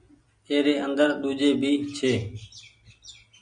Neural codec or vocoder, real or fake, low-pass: none; real; 9.9 kHz